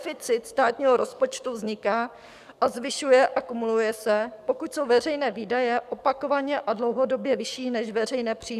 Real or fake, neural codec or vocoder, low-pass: fake; codec, 44.1 kHz, 7.8 kbps, DAC; 14.4 kHz